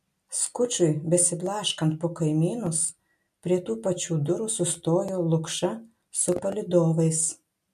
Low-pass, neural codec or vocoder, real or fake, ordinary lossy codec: 14.4 kHz; none; real; MP3, 64 kbps